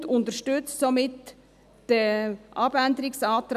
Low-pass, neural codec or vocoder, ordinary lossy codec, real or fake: 14.4 kHz; none; none; real